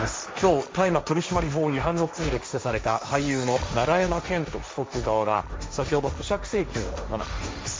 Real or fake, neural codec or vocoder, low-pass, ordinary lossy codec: fake; codec, 16 kHz, 1.1 kbps, Voila-Tokenizer; none; none